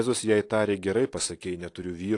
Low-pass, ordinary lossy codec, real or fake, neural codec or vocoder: 10.8 kHz; AAC, 48 kbps; real; none